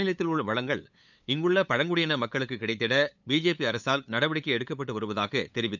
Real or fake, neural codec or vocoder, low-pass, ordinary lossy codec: fake; codec, 16 kHz, 8 kbps, FunCodec, trained on LibriTTS, 25 frames a second; 7.2 kHz; none